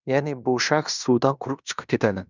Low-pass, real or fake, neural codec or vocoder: 7.2 kHz; fake; codec, 16 kHz in and 24 kHz out, 0.9 kbps, LongCat-Audio-Codec, fine tuned four codebook decoder